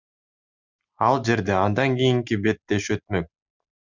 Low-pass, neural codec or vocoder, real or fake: 7.2 kHz; none; real